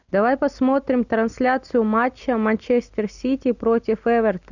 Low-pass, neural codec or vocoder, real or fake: 7.2 kHz; none; real